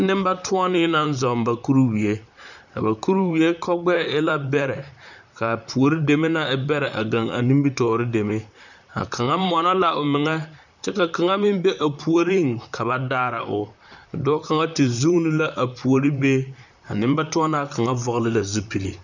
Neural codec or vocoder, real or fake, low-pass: vocoder, 44.1 kHz, 128 mel bands, Pupu-Vocoder; fake; 7.2 kHz